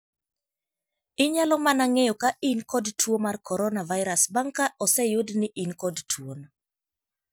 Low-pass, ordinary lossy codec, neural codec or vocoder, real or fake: none; none; none; real